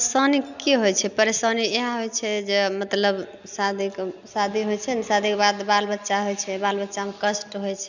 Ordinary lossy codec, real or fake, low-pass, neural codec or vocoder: none; real; 7.2 kHz; none